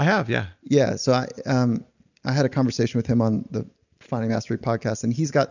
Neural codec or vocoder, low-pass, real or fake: none; 7.2 kHz; real